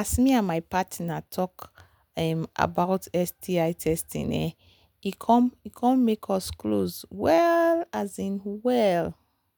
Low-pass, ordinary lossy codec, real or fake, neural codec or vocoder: none; none; real; none